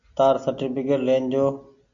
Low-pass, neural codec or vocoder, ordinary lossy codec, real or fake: 7.2 kHz; none; MP3, 64 kbps; real